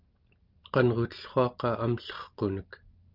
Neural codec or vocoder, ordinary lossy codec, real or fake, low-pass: none; Opus, 24 kbps; real; 5.4 kHz